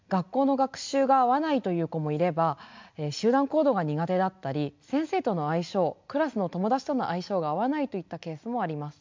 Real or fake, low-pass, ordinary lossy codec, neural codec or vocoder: real; 7.2 kHz; MP3, 64 kbps; none